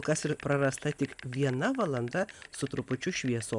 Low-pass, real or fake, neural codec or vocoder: 10.8 kHz; real; none